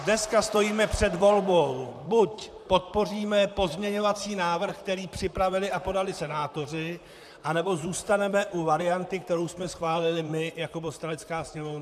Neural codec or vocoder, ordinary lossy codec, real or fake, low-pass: vocoder, 44.1 kHz, 128 mel bands, Pupu-Vocoder; MP3, 96 kbps; fake; 14.4 kHz